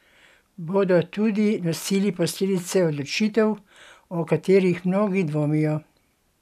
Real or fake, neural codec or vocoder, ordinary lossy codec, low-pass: real; none; none; 14.4 kHz